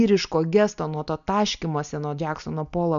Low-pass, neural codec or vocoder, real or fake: 7.2 kHz; none; real